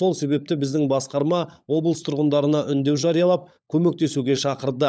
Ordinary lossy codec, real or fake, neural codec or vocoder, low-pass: none; fake; codec, 16 kHz, 16 kbps, FunCodec, trained on LibriTTS, 50 frames a second; none